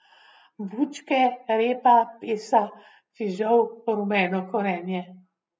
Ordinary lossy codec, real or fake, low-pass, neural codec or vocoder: none; real; none; none